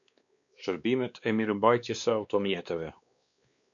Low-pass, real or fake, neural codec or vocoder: 7.2 kHz; fake; codec, 16 kHz, 2 kbps, X-Codec, WavLM features, trained on Multilingual LibriSpeech